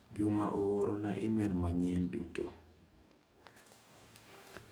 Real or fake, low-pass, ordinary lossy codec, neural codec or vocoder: fake; none; none; codec, 44.1 kHz, 2.6 kbps, DAC